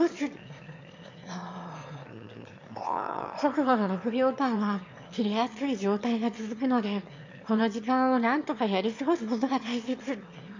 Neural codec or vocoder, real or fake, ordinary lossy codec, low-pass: autoencoder, 22.05 kHz, a latent of 192 numbers a frame, VITS, trained on one speaker; fake; MP3, 48 kbps; 7.2 kHz